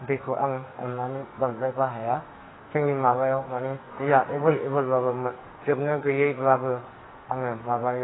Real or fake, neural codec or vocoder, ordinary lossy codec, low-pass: fake; codec, 44.1 kHz, 2.6 kbps, SNAC; AAC, 16 kbps; 7.2 kHz